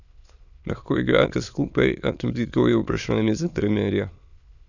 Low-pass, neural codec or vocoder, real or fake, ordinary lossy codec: 7.2 kHz; autoencoder, 22.05 kHz, a latent of 192 numbers a frame, VITS, trained on many speakers; fake; none